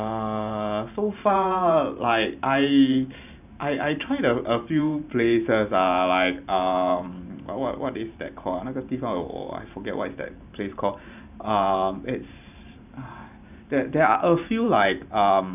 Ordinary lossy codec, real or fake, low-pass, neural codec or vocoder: none; fake; 3.6 kHz; vocoder, 44.1 kHz, 128 mel bands every 512 samples, BigVGAN v2